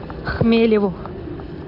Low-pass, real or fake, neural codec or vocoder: 5.4 kHz; real; none